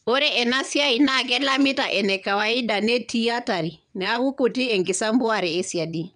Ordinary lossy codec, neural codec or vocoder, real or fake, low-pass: none; vocoder, 22.05 kHz, 80 mel bands, WaveNeXt; fake; 9.9 kHz